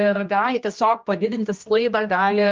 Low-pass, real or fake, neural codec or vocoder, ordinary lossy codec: 7.2 kHz; fake; codec, 16 kHz, 1 kbps, X-Codec, HuBERT features, trained on general audio; Opus, 24 kbps